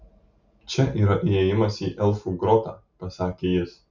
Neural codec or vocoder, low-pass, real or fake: none; 7.2 kHz; real